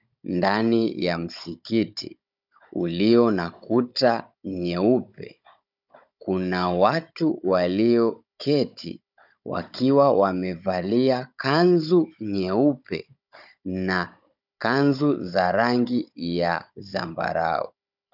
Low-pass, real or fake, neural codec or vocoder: 5.4 kHz; fake; codec, 16 kHz, 16 kbps, FunCodec, trained on Chinese and English, 50 frames a second